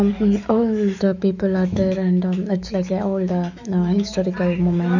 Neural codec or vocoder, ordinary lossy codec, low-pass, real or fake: autoencoder, 48 kHz, 128 numbers a frame, DAC-VAE, trained on Japanese speech; none; 7.2 kHz; fake